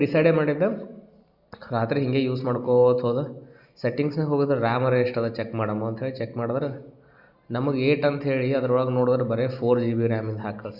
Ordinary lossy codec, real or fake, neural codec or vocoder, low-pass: Opus, 64 kbps; real; none; 5.4 kHz